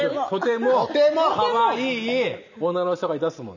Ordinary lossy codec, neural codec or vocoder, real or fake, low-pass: none; none; real; 7.2 kHz